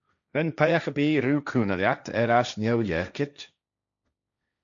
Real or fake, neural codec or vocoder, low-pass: fake; codec, 16 kHz, 1.1 kbps, Voila-Tokenizer; 7.2 kHz